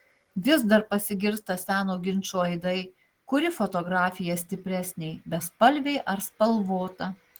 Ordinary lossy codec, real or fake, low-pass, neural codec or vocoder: Opus, 16 kbps; real; 19.8 kHz; none